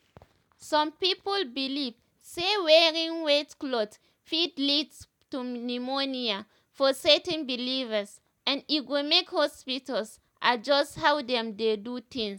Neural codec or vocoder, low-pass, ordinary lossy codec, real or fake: none; 19.8 kHz; none; real